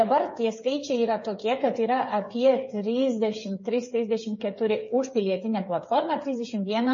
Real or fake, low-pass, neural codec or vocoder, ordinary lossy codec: fake; 7.2 kHz; codec, 16 kHz, 8 kbps, FreqCodec, smaller model; MP3, 32 kbps